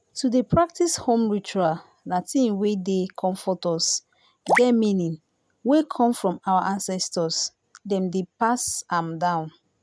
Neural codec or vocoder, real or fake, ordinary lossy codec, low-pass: none; real; none; none